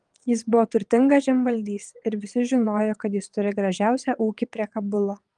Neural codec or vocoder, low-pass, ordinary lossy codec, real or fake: vocoder, 22.05 kHz, 80 mel bands, WaveNeXt; 9.9 kHz; Opus, 24 kbps; fake